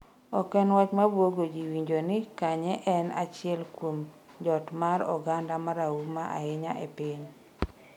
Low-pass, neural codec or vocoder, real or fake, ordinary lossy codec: 19.8 kHz; none; real; none